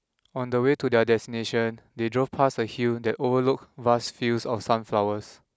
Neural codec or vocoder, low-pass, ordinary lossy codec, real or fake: none; none; none; real